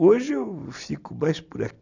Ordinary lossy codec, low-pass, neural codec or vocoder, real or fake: none; 7.2 kHz; none; real